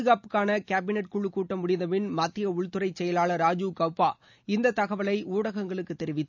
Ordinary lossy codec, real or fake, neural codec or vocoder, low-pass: none; real; none; 7.2 kHz